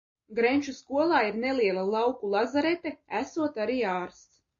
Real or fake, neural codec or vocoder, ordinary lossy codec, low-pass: real; none; AAC, 48 kbps; 7.2 kHz